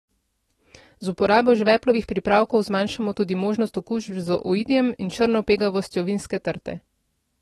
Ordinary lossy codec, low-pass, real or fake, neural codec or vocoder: AAC, 32 kbps; 19.8 kHz; fake; autoencoder, 48 kHz, 128 numbers a frame, DAC-VAE, trained on Japanese speech